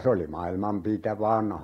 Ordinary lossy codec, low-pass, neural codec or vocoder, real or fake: AAC, 48 kbps; 19.8 kHz; none; real